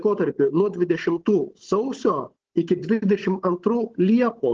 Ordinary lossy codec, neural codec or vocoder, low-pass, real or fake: Opus, 16 kbps; codec, 16 kHz, 16 kbps, FunCodec, trained on Chinese and English, 50 frames a second; 7.2 kHz; fake